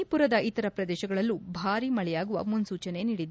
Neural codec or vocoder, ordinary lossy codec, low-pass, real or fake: none; none; none; real